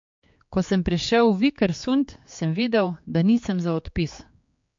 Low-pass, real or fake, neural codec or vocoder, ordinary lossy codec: 7.2 kHz; fake; codec, 16 kHz, 4 kbps, X-Codec, HuBERT features, trained on general audio; MP3, 48 kbps